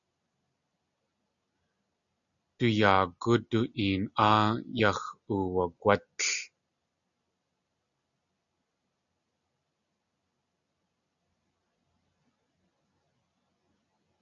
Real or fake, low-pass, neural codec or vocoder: real; 7.2 kHz; none